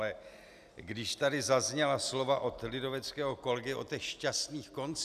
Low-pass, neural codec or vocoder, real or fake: 14.4 kHz; none; real